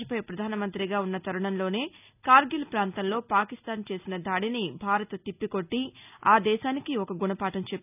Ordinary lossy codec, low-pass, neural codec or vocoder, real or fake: none; 3.6 kHz; none; real